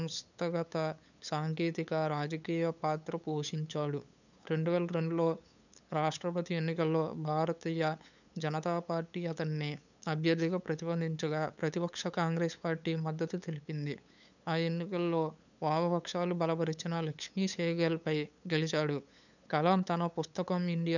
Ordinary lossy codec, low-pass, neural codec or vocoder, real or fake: none; 7.2 kHz; codec, 16 kHz, 8 kbps, FunCodec, trained on LibriTTS, 25 frames a second; fake